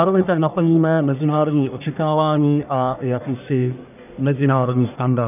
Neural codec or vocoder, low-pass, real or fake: codec, 44.1 kHz, 1.7 kbps, Pupu-Codec; 3.6 kHz; fake